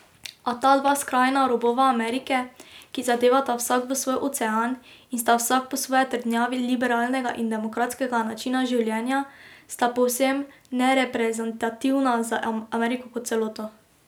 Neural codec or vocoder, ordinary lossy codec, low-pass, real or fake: none; none; none; real